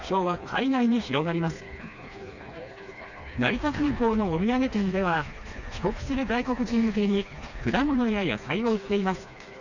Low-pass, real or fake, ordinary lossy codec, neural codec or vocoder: 7.2 kHz; fake; none; codec, 16 kHz, 2 kbps, FreqCodec, smaller model